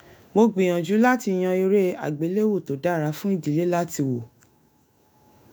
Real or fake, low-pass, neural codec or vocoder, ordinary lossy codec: fake; none; autoencoder, 48 kHz, 128 numbers a frame, DAC-VAE, trained on Japanese speech; none